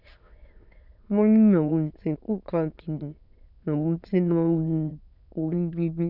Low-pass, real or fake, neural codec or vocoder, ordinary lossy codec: 5.4 kHz; fake; autoencoder, 22.05 kHz, a latent of 192 numbers a frame, VITS, trained on many speakers; none